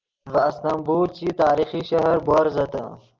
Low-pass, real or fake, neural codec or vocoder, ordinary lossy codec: 7.2 kHz; real; none; Opus, 32 kbps